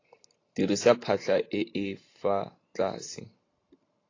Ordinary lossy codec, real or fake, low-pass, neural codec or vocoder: AAC, 32 kbps; fake; 7.2 kHz; vocoder, 44.1 kHz, 128 mel bands every 512 samples, BigVGAN v2